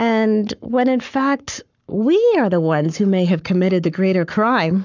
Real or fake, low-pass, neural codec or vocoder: fake; 7.2 kHz; codec, 44.1 kHz, 7.8 kbps, Pupu-Codec